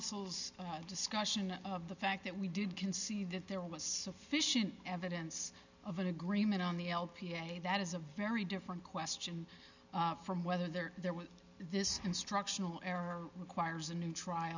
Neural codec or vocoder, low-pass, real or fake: none; 7.2 kHz; real